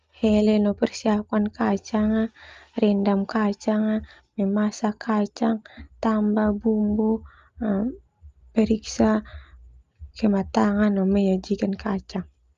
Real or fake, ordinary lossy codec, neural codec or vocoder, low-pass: real; Opus, 32 kbps; none; 7.2 kHz